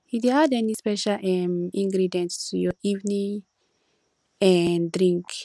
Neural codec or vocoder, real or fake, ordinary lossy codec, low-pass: none; real; none; none